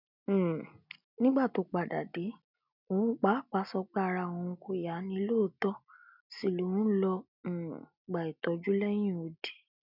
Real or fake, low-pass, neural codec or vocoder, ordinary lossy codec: real; 5.4 kHz; none; none